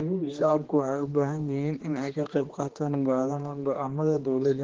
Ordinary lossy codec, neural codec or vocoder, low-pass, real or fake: Opus, 16 kbps; codec, 16 kHz, 2 kbps, X-Codec, HuBERT features, trained on general audio; 7.2 kHz; fake